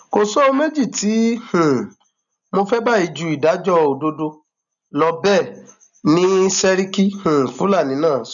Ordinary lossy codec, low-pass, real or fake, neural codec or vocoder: none; 7.2 kHz; real; none